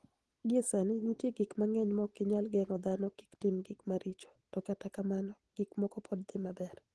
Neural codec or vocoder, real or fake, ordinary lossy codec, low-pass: none; real; Opus, 16 kbps; 10.8 kHz